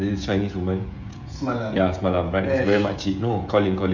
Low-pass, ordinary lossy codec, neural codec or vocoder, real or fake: 7.2 kHz; none; codec, 16 kHz, 16 kbps, FreqCodec, smaller model; fake